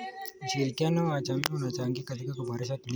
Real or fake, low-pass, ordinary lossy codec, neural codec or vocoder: real; none; none; none